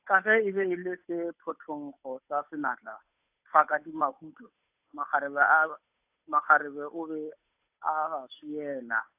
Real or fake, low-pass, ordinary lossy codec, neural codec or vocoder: fake; 3.6 kHz; AAC, 32 kbps; codec, 16 kHz, 8 kbps, FunCodec, trained on Chinese and English, 25 frames a second